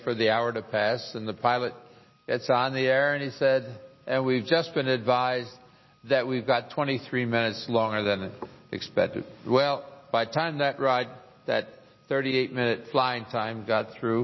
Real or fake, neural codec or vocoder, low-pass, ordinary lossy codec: real; none; 7.2 kHz; MP3, 24 kbps